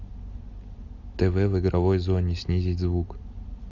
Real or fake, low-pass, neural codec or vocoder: real; 7.2 kHz; none